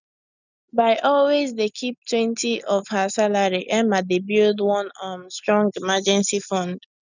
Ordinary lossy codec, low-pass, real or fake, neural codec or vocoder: none; 7.2 kHz; real; none